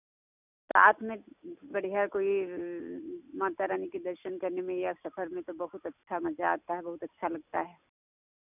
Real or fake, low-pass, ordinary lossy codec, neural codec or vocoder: real; 3.6 kHz; none; none